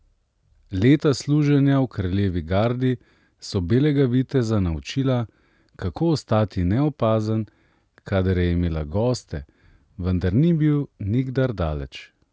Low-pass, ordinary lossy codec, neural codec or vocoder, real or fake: none; none; none; real